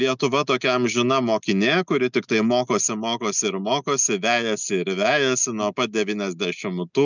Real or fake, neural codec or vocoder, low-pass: real; none; 7.2 kHz